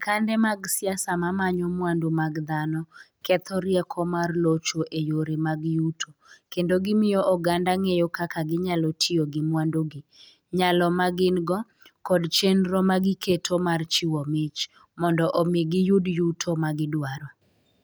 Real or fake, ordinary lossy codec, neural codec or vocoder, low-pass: real; none; none; none